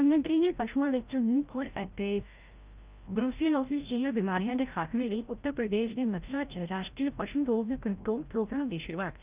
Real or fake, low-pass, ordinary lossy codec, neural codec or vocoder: fake; 3.6 kHz; Opus, 24 kbps; codec, 16 kHz, 0.5 kbps, FreqCodec, larger model